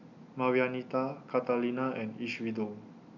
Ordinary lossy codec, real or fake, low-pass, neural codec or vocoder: none; real; 7.2 kHz; none